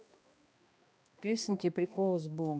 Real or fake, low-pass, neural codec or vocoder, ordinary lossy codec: fake; none; codec, 16 kHz, 1 kbps, X-Codec, HuBERT features, trained on balanced general audio; none